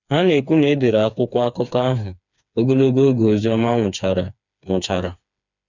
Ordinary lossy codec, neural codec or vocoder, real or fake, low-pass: none; codec, 16 kHz, 4 kbps, FreqCodec, smaller model; fake; 7.2 kHz